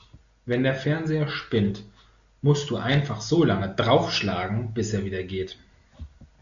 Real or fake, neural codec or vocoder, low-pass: real; none; 7.2 kHz